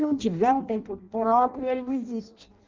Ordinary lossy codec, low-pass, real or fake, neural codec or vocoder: Opus, 24 kbps; 7.2 kHz; fake; codec, 16 kHz in and 24 kHz out, 0.6 kbps, FireRedTTS-2 codec